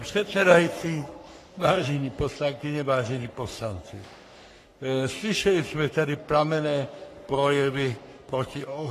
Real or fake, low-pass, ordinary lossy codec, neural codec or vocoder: fake; 14.4 kHz; AAC, 48 kbps; codec, 44.1 kHz, 3.4 kbps, Pupu-Codec